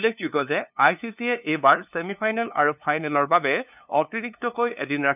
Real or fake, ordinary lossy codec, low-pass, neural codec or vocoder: fake; none; 3.6 kHz; codec, 16 kHz, 2 kbps, FunCodec, trained on LibriTTS, 25 frames a second